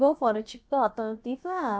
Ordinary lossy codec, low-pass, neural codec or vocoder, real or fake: none; none; codec, 16 kHz, about 1 kbps, DyCAST, with the encoder's durations; fake